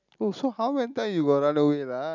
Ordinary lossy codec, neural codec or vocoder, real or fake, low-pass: none; none; real; 7.2 kHz